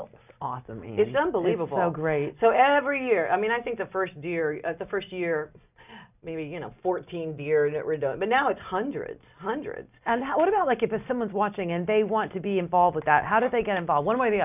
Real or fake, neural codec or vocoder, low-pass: real; none; 3.6 kHz